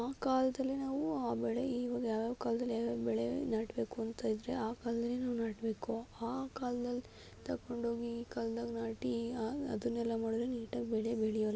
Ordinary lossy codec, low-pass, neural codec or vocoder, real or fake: none; none; none; real